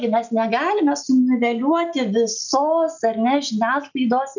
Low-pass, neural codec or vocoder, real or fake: 7.2 kHz; none; real